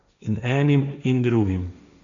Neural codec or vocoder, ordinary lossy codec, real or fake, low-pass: codec, 16 kHz, 1.1 kbps, Voila-Tokenizer; none; fake; 7.2 kHz